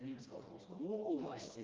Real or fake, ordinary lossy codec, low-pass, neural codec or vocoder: fake; Opus, 32 kbps; 7.2 kHz; codec, 16 kHz, 1 kbps, FreqCodec, smaller model